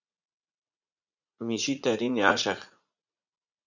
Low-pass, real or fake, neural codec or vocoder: 7.2 kHz; fake; vocoder, 44.1 kHz, 80 mel bands, Vocos